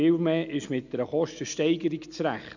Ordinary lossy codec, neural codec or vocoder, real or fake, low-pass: none; none; real; 7.2 kHz